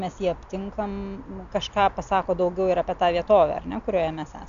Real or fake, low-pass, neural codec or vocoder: real; 7.2 kHz; none